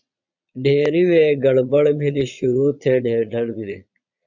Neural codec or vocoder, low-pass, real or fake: vocoder, 22.05 kHz, 80 mel bands, Vocos; 7.2 kHz; fake